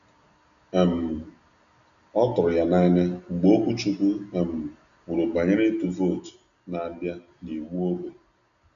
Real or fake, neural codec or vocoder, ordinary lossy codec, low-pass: real; none; none; 7.2 kHz